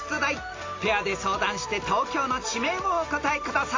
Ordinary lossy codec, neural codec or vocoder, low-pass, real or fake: AAC, 32 kbps; none; 7.2 kHz; real